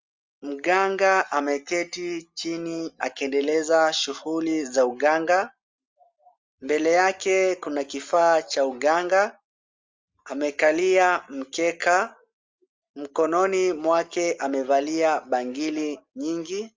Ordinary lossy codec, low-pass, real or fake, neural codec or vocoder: Opus, 32 kbps; 7.2 kHz; real; none